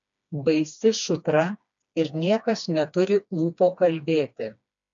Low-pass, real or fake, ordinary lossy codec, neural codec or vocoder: 7.2 kHz; fake; MP3, 64 kbps; codec, 16 kHz, 2 kbps, FreqCodec, smaller model